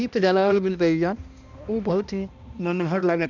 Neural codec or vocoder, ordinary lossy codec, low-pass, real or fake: codec, 16 kHz, 1 kbps, X-Codec, HuBERT features, trained on balanced general audio; none; 7.2 kHz; fake